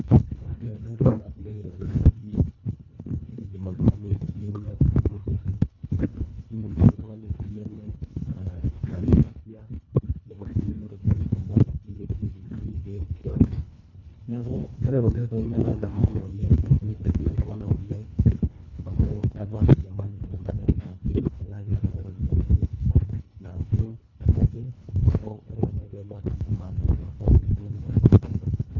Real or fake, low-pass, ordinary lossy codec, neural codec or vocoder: fake; 7.2 kHz; none; codec, 24 kHz, 1.5 kbps, HILCodec